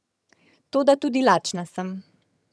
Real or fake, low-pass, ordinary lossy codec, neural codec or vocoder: fake; none; none; vocoder, 22.05 kHz, 80 mel bands, HiFi-GAN